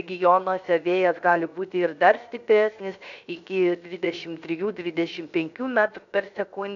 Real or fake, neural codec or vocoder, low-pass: fake; codec, 16 kHz, 0.7 kbps, FocalCodec; 7.2 kHz